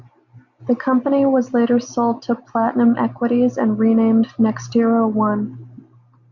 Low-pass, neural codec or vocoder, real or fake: 7.2 kHz; none; real